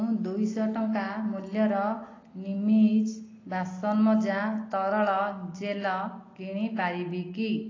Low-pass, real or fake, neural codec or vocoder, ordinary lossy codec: 7.2 kHz; real; none; AAC, 32 kbps